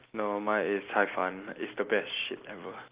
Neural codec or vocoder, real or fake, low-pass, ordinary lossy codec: none; real; 3.6 kHz; Opus, 24 kbps